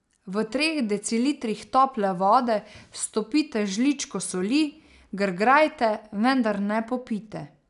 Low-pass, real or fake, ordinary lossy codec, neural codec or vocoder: 10.8 kHz; real; none; none